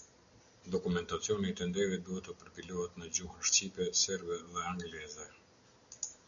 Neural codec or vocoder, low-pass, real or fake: none; 7.2 kHz; real